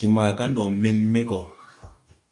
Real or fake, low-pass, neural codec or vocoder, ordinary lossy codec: fake; 10.8 kHz; codec, 44.1 kHz, 2.6 kbps, DAC; MP3, 96 kbps